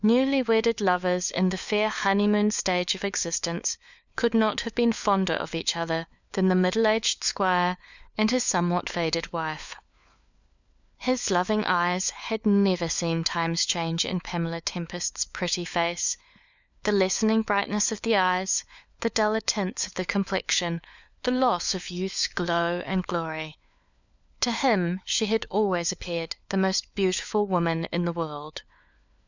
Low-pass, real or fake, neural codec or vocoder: 7.2 kHz; fake; codec, 16 kHz, 4 kbps, FunCodec, trained on LibriTTS, 50 frames a second